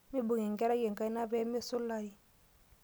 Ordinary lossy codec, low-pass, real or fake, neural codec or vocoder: none; none; real; none